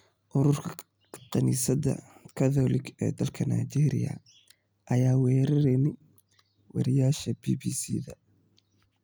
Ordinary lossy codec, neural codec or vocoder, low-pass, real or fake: none; none; none; real